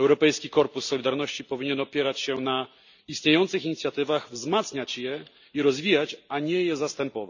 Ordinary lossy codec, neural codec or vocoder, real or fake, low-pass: none; none; real; 7.2 kHz